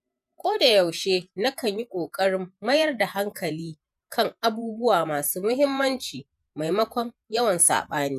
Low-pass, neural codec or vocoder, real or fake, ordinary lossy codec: 14.4 kHz; vocoder, 48 kHz, 128 mel bands, Vocos; fake; none